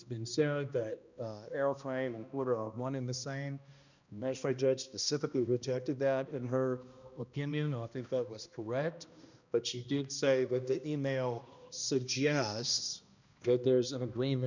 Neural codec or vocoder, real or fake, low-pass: codec, 16 kHz, 1 kbps, X-Codec, HuBERT features, trained on balanced general audio; fake; 7.2 kHz